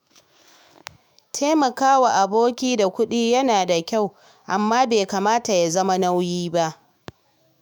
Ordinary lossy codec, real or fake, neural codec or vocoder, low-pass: none; fake; autoencoder, 48 kHz, 128 numbers a frame, DAC-VAE, trained on Japanese speech; none